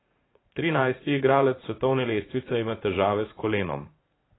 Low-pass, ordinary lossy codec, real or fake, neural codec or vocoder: 7.2 kHz; AAC, 16 kbps; fake; vocoder, 44.1 kHz, 80 mel bands, Vocos